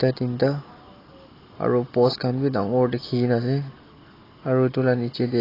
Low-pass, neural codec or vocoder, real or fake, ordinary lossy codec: 5.4 kHz; none; real; AAC, 24 kbps